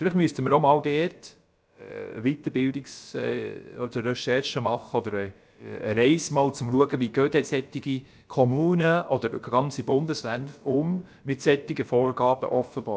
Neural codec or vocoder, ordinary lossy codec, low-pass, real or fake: codec, 16 kHz, about 1 kbps, DyCAST, with the encoder's durations; none; none; fake